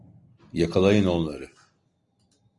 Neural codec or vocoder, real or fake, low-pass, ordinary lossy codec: none; real; 10.8 kHz; Opus, 64 kbps